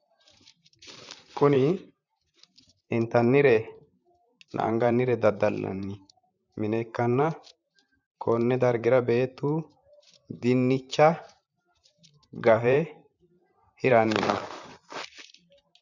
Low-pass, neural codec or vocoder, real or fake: 7.2 kHz; vocoder, 44.1 kHz, 128 mel bands, Pupu-Vocoder; fake